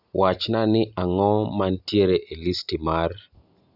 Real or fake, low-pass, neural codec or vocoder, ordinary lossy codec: real; 5.4 kHz; none; none